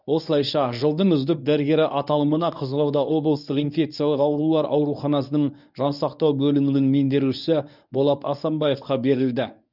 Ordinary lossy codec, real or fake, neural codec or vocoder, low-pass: none; fake; codec, 24 kHz, 0.9 kbps, WavTokenizer, medium speech release version 1; 5.4 kHz